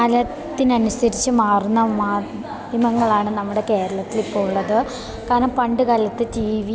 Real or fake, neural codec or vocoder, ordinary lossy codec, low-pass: real; none; none; none